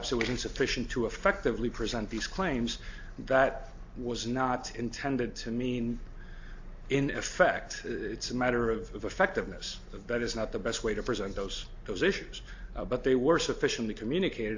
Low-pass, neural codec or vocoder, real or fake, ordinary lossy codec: 7.2 kHz; none; real; AAC, 48 kbps